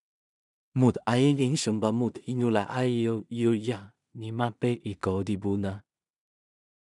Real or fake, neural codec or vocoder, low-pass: fake; codec, 16 kHz in and 24 kHz out, 0.4 kbps, LongCat-Audio-Codec, two codebook decoder; 10.8 kHz